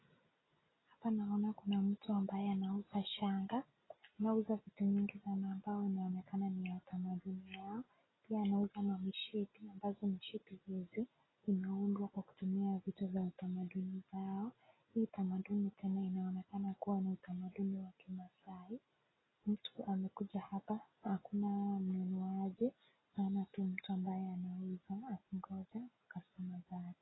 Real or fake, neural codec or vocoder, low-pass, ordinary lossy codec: real; none; 7.2 kHz; AAC, 16 kbps